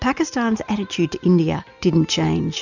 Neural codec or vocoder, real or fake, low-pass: none; real; 7.2 kHz